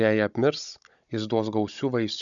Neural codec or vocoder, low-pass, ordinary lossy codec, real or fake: codec, 16 kHz, 16 kbps, FunCodec, trained on Chinese and English, 50 frames a second; 7.2 kHz; MP3, 96 kbps; fake